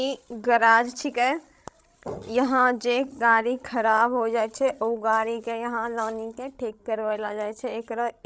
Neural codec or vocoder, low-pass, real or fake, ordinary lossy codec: codec, 16 kHz, 16 kbps, FreqCodec, larger model; none; fake; none